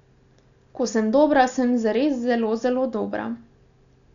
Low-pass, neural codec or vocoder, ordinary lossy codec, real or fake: 7.2 kHz; none; none; real